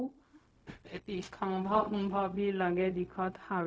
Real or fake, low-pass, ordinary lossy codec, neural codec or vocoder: fake; none; none; codec, 16 kHz, 0.4 kbps, LongCat-Audio-Codec